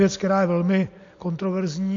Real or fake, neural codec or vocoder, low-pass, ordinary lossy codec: real; none; 7.2 kHz; AAC, 48 kbps